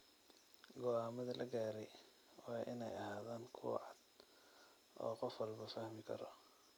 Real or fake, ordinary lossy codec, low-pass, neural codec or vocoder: real; none; none; none